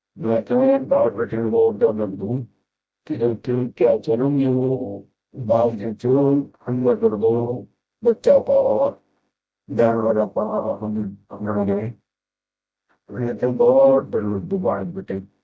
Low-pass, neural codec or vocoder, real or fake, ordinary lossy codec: none; codec, 16 kHz, 0.5 kbps, FreqCodec, smaller model; fake; none